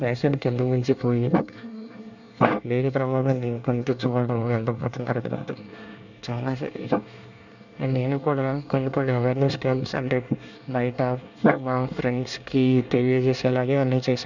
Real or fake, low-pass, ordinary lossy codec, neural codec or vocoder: fake; 7.2 kHz; none; codec, 24 kHz, 1 kbps, SNAC